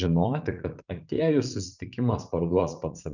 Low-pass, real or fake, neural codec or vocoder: 7.2 kHz; fake; vocoder, 44.1 kHz, 80 mel bands, Vocos